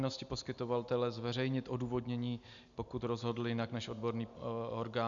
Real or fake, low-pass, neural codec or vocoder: real; 7.2 kHz; none